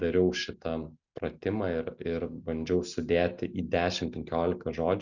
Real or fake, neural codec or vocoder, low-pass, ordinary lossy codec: real; none; 7.2 kHz; Opus, 64 kbps